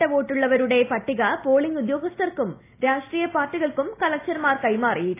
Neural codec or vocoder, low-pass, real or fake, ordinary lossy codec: none; 3.6 kHz; real; AAC, 24 kbps